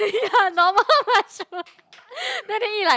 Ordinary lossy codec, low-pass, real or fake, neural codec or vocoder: none; none; real; none